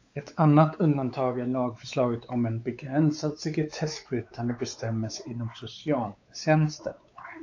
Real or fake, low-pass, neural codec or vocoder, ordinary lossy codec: fake; 7.2 kHz; codec, 16 kHz, 4 kbps, X-Codec, WavLM features, trained on Multilingual LibriSpeech; AAC, 48 kbps